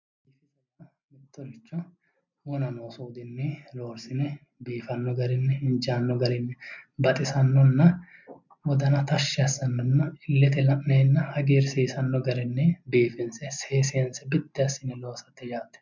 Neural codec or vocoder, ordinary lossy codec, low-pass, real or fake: none; MP3, 64 kbps; 7.2 kHz; real